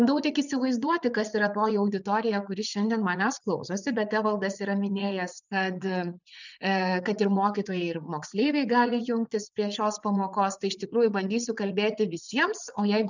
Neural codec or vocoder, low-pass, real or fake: vocoder, 44.1 kHz, 80 mel bands, Vocos; 7.2 kHz; fake